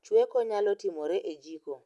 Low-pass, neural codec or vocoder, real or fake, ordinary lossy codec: none; none; real; none